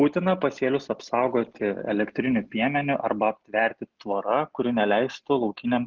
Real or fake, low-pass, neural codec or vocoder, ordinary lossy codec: real; 7.2 kHz; none; Opus, 24 kbps